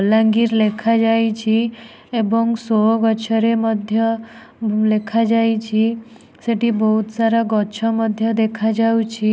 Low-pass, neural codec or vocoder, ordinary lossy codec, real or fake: none; none; none; real